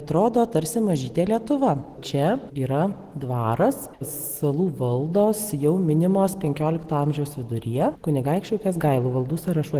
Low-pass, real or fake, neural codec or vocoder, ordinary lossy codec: 14.4 kHz; real; none; Opus, 16 kbps